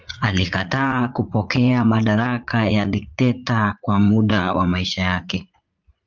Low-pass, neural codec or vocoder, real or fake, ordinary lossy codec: 7.2 kHz; vocoder, 44.1 kHz, 80 mel bands, Vocos; fake; Opus, 32 kbps